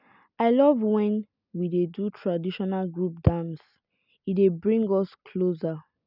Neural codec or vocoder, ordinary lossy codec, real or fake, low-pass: none; none; real; 5.4 kHz